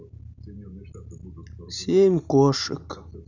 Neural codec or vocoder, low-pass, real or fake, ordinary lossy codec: none; 7.2 kHz; real; none